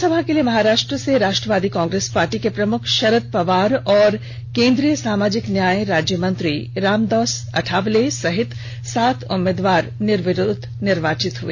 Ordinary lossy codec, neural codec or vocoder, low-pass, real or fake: MP3, 48 kbps; none; 7.2 kHz; real